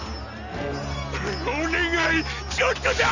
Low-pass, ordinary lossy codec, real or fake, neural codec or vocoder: 7.2 kHz; none; real; none